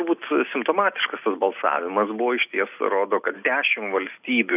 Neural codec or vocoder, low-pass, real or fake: none; 3.6 kHz; real